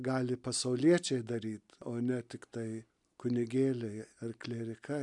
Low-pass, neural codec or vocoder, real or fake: 10.8 kHz; none; real